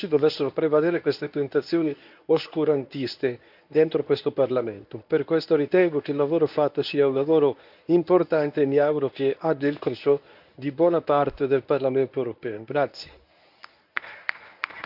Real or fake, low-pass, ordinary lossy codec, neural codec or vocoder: fake; 5.4 kHz; none; codec, 24 kHz, 0.9 kbps, WavTokenizer, medium speech release version 1